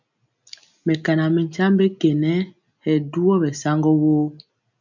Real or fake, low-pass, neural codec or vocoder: real; 7.2 kHz; none